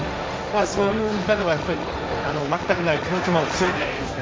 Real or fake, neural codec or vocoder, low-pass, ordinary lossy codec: fake; codec, 16 kHz, 1.1 kbps, Voila-Tokenizer; none; none